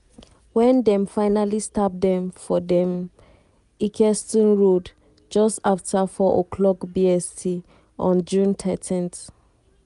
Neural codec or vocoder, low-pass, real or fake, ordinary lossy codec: none; 10.8 kHz; real; none